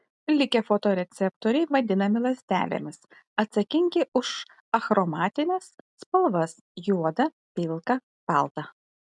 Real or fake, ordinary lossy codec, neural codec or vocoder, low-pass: real; MP3, 96 kbps; none; 10.8 kHz